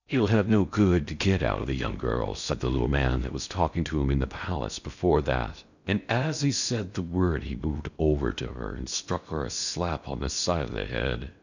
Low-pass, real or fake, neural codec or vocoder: 7.2 kHz; fake; codec, 16 kHz in and 24 kHz out, 0.6 kbps, FocalCodec, streaming, 4096 codes